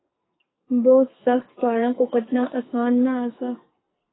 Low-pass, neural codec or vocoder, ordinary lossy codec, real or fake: 7.2 kHz; codec, 44.1 kHz, 2.6 kbps, SNAC; AAC, 16 kbps; fake